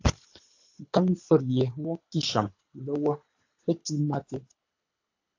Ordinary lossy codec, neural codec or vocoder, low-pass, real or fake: AAC, 48 kbps; codec, 24 kHz, 6 kbps, HILCodec; 7.2 kHz; fake